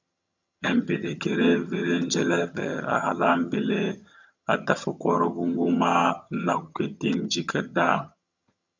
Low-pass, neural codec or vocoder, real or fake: 7.2 kHz; vocoder, 22.05 kHz, 80 mel bands, HiFi-GAN; fake